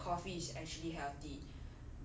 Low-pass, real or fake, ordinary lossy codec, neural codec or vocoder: none; real; none; none